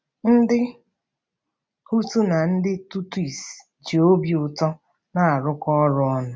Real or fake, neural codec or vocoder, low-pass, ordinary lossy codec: real; none; none; none